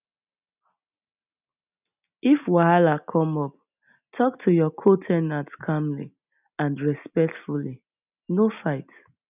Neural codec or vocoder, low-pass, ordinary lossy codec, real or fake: none; 3.6 kHz; none; real